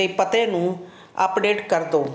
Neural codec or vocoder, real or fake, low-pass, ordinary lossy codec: none; real; none; none